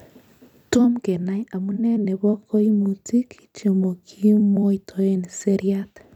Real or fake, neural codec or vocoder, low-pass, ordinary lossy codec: fake; vocoder, 44.1 kHz, 128 mel bands every 256 samples, BigVGAN v2; 19.8 kHz; none